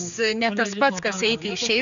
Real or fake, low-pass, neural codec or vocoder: fake; 7.2 kHz; codec, 16 kHz, 4 kbps, X-Codec, HuBERT features, trained on general audio